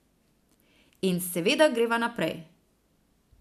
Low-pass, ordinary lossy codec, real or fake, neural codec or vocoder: 14.4 kHz; none; real; none